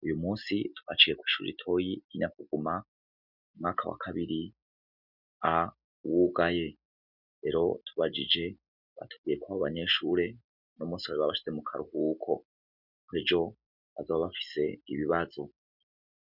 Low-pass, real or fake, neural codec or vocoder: 5.4 kHz; real; none